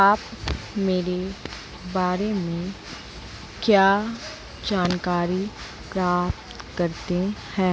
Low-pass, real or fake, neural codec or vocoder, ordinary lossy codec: none; real; none; none